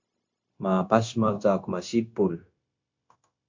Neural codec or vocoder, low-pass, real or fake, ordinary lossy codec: codec, 16 kHz, 0.9 kbps, LongCat-Audio-Codec; 7.2 kHz; fake; MP3, 48 kbps